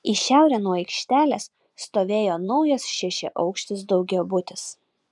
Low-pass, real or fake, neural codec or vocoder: 10.8 kHz; fake; vocoder, 24 kHz, 100 mel bands, Vocos